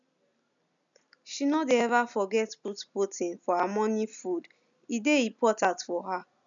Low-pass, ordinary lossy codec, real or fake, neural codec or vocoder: 7.2 kHz; none; real; none